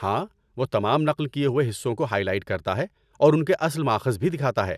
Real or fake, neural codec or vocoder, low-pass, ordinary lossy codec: real; none; 14.4 kHz; none